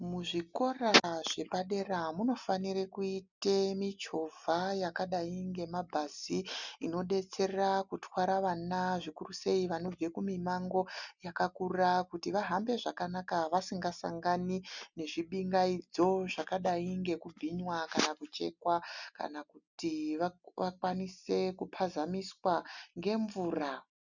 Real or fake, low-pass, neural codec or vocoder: real; 7.2 kHz; none